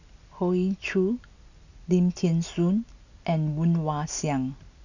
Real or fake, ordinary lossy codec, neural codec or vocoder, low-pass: real; none; none; 7.2 kHz